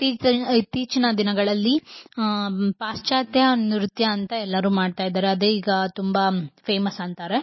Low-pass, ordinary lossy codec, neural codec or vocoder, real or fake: 7.2 kHz; MP3, 24 kbps; none; real